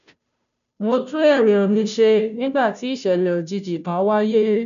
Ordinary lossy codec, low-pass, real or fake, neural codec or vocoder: none; 7.2 kHz; fake; codec, 16 kHz, 0.5 kbps, FunCodec, trained on Chinese and English, 25 frames a second